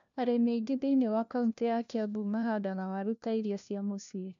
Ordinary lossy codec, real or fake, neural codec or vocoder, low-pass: none; fake; codec, 16 kHz, 1 kbps, FunCodec, trained on LibriTTS, 50 frames a second; 7.2 kHz